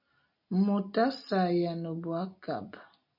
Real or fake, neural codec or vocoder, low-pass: real; none; 5.4 kHz